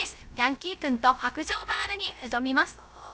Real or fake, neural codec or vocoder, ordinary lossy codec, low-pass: fake; codec, 16 kHz, 0.3 kbps, FocalCodec; none; none